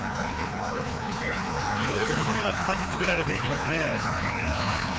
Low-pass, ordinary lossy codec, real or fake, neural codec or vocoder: none; none; fake; codec, 16 kHz, 2 kbps, FreqCodec, larger model